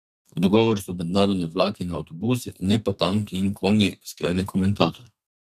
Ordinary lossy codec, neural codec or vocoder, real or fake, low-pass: none; codec, 32 kHz, 1.9 kbps, SNAC; fake; 14.4 kHz